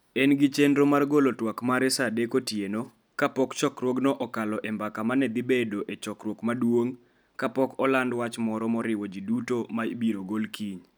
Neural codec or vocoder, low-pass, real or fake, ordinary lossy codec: none; none; real; none